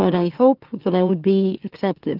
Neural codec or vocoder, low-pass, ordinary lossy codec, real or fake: autoencoder, 44.1 kHz, a latent of 192 numbers a frame, MeloTTS; 5.4 kHz; Opus, 32 kbps; fake